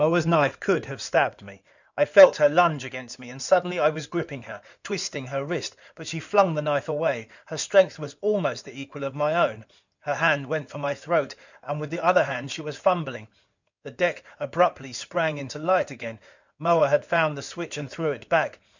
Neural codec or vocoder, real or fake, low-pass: codec, 16 kHz in and 24 kHz out, 2.2 kbps, FireRedTTS-2 codec; fake; 7.2 kHz